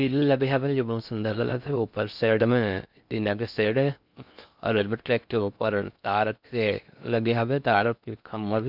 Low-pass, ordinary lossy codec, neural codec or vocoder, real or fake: 5.4 kHz; none; codec, 16 kHz in and 24 kHz out, 0.6 kbps, FocalCodec, streaming, 2048 codes; fake